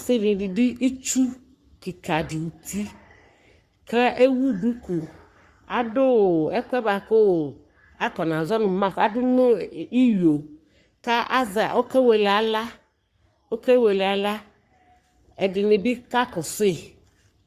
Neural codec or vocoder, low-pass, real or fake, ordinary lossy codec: codec, 44.1 kHz, 3.4 kbps, Pupu-Codec; 14.4 kHz; fake; Opus, 64 kbps